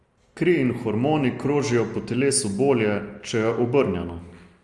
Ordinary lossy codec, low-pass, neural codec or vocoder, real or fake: Opus, 32 kbps; 10.8 kHz; none; real